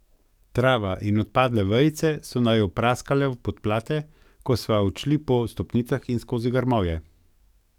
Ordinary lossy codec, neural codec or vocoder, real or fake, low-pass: none; codec, 44.1 kHz, 7.8 kbps, DAC; fake; 19.8 kHz